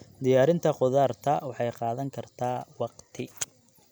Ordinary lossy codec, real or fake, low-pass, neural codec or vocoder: none; real; none; none